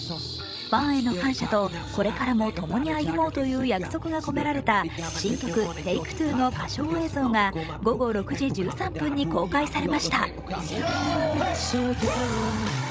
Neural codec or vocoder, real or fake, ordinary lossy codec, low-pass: codec, 16 kHz, 16 kbps, FreqCodec, larger model; fake; none; none